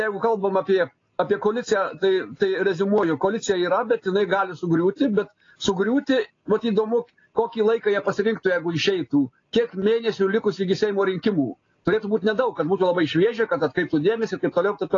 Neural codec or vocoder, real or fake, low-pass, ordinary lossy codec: none; real; 7.2 kHz; AAC, 32 kbps